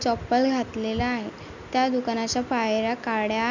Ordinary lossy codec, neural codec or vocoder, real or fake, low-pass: none; none; real; 7.2 kHz